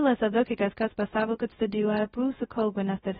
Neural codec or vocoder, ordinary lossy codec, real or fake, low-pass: codec, 16 kHz, 0.2 kbps, FocalCodec; AAC, 16 kbps; fake; 7.2 kHz